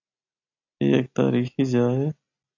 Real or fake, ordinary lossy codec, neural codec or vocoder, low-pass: real; AAC, 48 kbps; none; 7.2 kHz